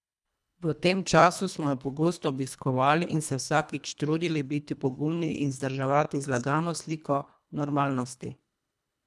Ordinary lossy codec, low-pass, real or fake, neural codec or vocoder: none; none; fake; codec, 24 kHz, 1.5 kbps, HILCodec